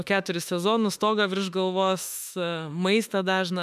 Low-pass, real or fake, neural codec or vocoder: 14.4 kHz; fake; autoencoder, 48 kHz, 32 numbers a frame, DAC-VAE, trained on Japanese speech